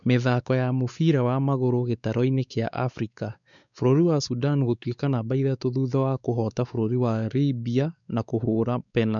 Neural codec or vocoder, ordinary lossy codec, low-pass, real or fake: codec, 16 kHz, 4 kbps, X-Codec, WavLM features, trained on Multilingual LibriSpeech; none; 7.2 kHz; fake